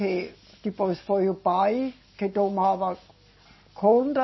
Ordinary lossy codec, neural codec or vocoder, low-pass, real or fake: MP3, 24 kbps; none; 7.2 kHz; real